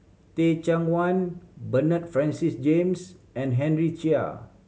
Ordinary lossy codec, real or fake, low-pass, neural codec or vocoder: none; real; none; none